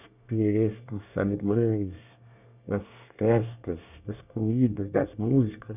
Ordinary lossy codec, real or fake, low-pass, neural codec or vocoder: none; fake; 3.6 kHz; codec, 24 kHz, 1 kbps, SNAC